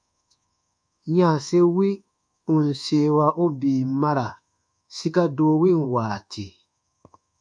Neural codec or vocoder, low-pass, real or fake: codec, 24 kHz, 1.2 kbps, DualCodec; 9.9 kHz; fake